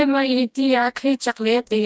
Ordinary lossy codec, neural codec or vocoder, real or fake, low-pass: none; codec, 16 kHz, 1 kbps, FreqCodec, smaller model; fake; none